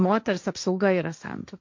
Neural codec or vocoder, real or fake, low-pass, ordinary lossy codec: codec, 16 kHz, 1.1 kbps, Voila-Tokenizer; fake; 7.2 kHz; MP3, 48 kbps